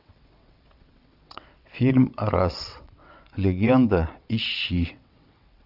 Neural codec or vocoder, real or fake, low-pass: vocoder, 22.05 kHz, 80 mel bands, WaveNeXt; fake; 5.4 kHz